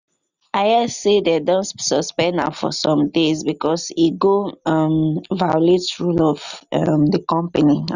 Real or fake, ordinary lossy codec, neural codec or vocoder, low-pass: real; none; none; 7.2 kHz